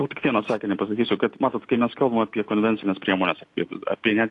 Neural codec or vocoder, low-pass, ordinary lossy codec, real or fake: none; 10.8 kHz; AAC, 48 kbps; real